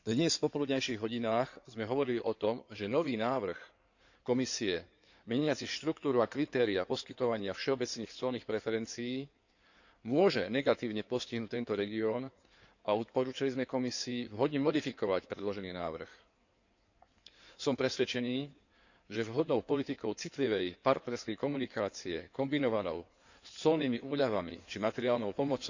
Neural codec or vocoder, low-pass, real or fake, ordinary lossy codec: codec, 16 kHz in and 24 kHz out, 2.2 kbps, FireRedTTS-2 codec; 7.2 kHz; fake; none